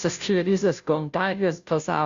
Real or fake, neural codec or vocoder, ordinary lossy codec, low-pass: fake; codec, 16 kHz, 0.5 kbps, FunCodec, trained on Chinese and English, 25 frames a second; Opus, 64 kbps; 7.2 kHz